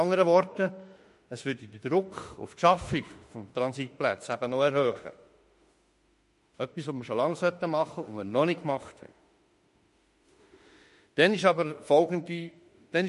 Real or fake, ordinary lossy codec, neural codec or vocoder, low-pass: fake; MP3, 48 kbps; autoencoder, 48 kHz, 32 numbers a frame, DAC-VAE, trained on Japanese speech; 14.4 kHz